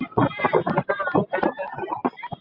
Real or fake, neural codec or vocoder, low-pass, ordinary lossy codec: real; none; 5.4 kHz; MP3, 48 kbps